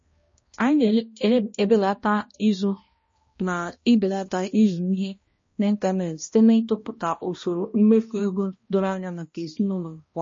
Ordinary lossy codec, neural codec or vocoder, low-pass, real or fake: MP3, 32 kbps; codec, 16 kHz, 1 kbps, X-Codec, HuBERT features, trained on balanced general audio; 7.2 kHz; fake